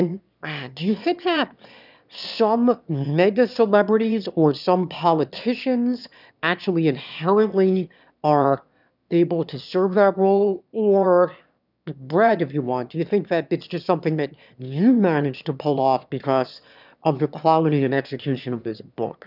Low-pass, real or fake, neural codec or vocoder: 5.4 kHz; fake; autoencoder, 22.05 kHz, a latent of 192 numbers a frame, VITS, trained on one speaker